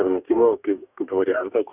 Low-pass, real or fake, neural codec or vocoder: 3.6 kHz; fake; codec, 44.1 kHz, 2.6 kbps, DAC